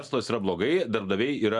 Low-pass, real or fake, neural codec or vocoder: 10.8 kHz; real; none